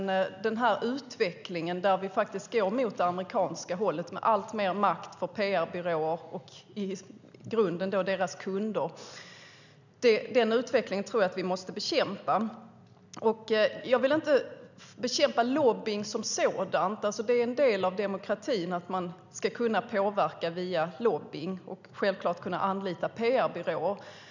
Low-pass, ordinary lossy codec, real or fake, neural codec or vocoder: 7.2 kHz; none; real; none